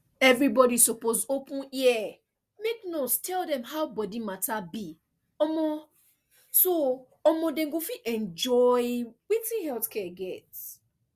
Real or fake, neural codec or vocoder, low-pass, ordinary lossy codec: real; none; 14.4 kHz; Opus, 64 kbps